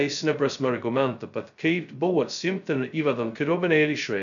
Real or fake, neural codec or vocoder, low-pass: fake; codec, 16 kHz, 0.2 kbps, FocalCodec; 7.2 kHz